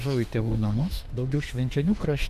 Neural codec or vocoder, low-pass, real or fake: autoencoder, 48 kHz, 32 numbers a frame, DAC-VAE, trained on Japanese speech; 14.4 kHz; fake